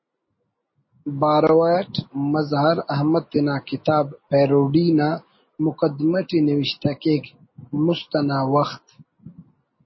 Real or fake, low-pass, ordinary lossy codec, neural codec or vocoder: real; 7.2 kHz; MP3, 24 kbps; none